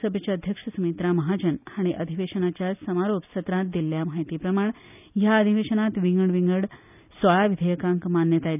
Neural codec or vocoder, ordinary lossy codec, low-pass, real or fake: none; none; 3.6 kHz; real